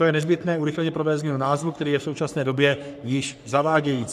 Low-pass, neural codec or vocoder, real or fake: 14.4 kHz; codec, 44.1 kHz, 3.4 kbps, Pupu-Codec; fake